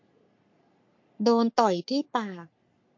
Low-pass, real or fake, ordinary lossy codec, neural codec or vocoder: 7.2 kHz; fake; MP3, 48 kbps; codec, 44.1 kHz, 3.4 kbps, Pupu-Codec